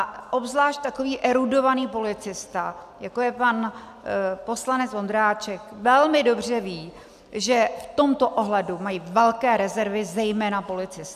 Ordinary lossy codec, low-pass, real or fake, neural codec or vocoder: MP3, 96 kbps; 14.4 kHz; real; none